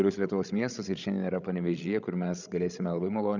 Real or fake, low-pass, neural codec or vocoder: fake; 7.2 kHz; codec, 16 kHz, 16 kbps, FreqCodec, larger model